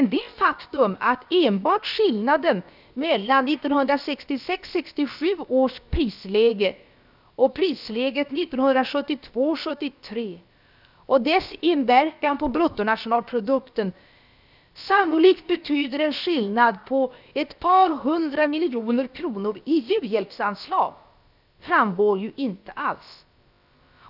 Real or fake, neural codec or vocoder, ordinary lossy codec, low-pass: fake; codec, 16 kHz, about 1 kbps, DyCAST, with the encoder's durations; none; 5.4 kHz